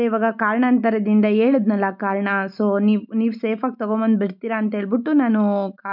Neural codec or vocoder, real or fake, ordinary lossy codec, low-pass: none; real; none; 5.4 kHz